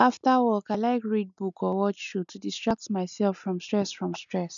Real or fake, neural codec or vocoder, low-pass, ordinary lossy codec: real; none; 7.2 kHz; none